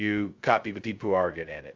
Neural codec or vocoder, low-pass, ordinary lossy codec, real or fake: codec, 16 kHz, 0.2 kbps, FocalCodec; 7.2 kHz; Opus, 32 kbps; fake